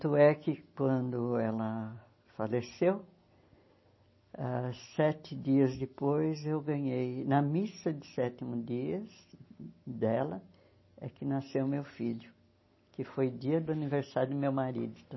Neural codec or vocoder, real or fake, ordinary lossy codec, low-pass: none; real; MP3, 24 kbps; 7.2 kHz